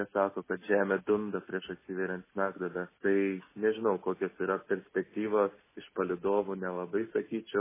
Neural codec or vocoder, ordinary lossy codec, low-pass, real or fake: none; MP3, 16 kbps; 3.6 kHz; real